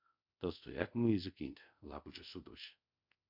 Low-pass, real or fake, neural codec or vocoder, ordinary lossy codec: 5.4 kHz; fake; codec, 24 kHz, 0.5 kbps, DualCodec; MP3, 48 kbps